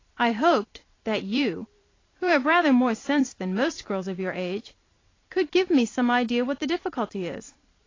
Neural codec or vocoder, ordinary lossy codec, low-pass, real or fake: vocoder, 44.1 kHz, 128 mel bands every 512 samples, BigVGAN v2; AAC, 32 kbps; 7.2 kHz; fake